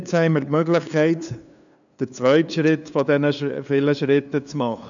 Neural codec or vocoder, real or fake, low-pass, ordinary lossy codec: codec, 16 kHz, 2 kbps, FunCodec, trained on LibriTTS, 25 frames a second; fake; 7.2 kHz; none